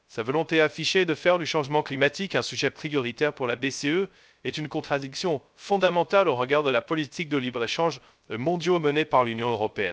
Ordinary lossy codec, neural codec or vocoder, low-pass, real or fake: none; codec, 16 kHz, 0.3 kbps, FocalCodec; none; fake